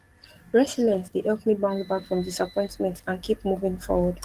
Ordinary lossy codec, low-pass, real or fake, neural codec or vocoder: Opus, 24 kbps; 14.4 kHz; fake; codec, 44.1 kHz, 7.8 kbps, DAC